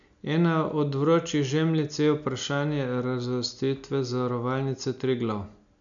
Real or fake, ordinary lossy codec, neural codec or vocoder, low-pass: real; none; none; 7.2 kHz